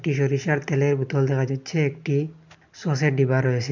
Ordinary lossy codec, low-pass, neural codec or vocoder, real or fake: none; 7.2 kHz; none; real